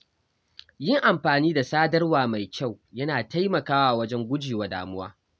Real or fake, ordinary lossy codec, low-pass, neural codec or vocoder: real; none; none; none